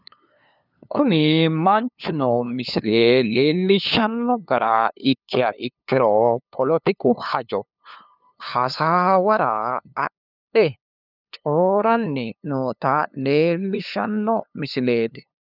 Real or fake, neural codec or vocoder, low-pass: fake; codec, 16 kHz, 2 kbps, FunCodec, trained on LibriTTS, 25 frames a second; 5.4 kHz